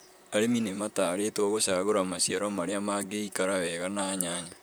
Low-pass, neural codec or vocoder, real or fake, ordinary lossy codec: none; vocoder, 44.1 kHz, 128 mel bands, Pupu-Vocoder; fake; none